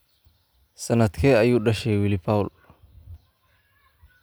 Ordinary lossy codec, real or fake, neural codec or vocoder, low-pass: none; real; none; none